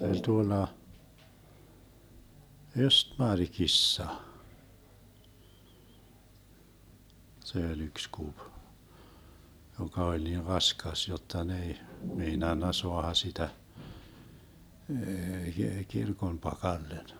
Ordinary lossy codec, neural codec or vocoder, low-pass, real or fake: none; none; none; real